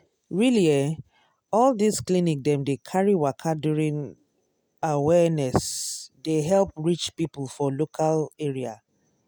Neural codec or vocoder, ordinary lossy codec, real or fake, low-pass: none; none; real; none